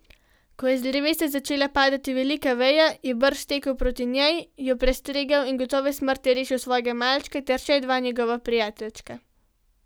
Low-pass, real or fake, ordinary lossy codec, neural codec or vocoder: none; real; none; none